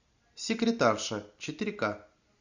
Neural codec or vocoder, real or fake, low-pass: none; real; 7.2 kHz